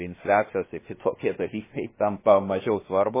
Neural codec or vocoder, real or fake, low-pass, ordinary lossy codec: codec, 16 kHz, about 1 kbps, DyCAST, with the encoder's durations; fake; 3.6 kHz; MP3, 16 kbps